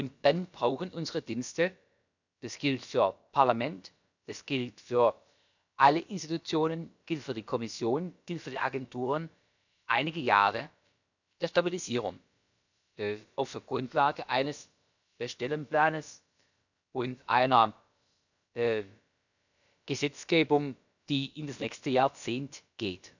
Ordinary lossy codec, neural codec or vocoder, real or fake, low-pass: none; codec, 16 kHz, about 1 kbps, DyCAST, with the encoder's durations; fake; 7.2 kHz